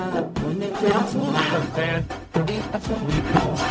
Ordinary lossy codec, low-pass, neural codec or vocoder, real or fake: none; none; codec, 16 kHz, 0.4 kbps, LongCat-Audio-Codec; fake